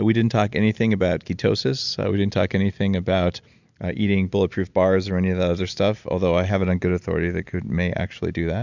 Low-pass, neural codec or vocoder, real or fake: 7.2 kHz; none; real